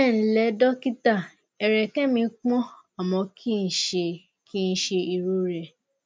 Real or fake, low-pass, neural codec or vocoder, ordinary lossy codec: real; none; none; none